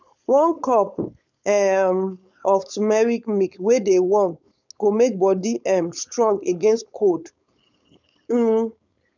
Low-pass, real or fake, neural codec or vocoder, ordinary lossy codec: 7.2 kHz; fake; codec, 16 kHz, 4.8 kbps, FACodec; none